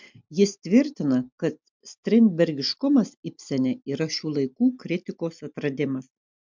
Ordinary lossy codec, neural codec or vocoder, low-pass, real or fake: MP3, 64 kbps; none; 7.2 kHz; real